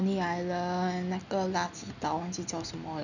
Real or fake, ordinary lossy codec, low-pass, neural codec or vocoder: real; none; 7.2 kHz; none